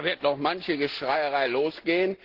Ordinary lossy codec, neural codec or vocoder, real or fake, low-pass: Opus, 16 kbps; none; real; 5.4 kHz